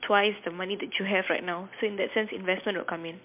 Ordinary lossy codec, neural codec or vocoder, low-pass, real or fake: MP3, 32 kbps; none; 3.6 kHz; real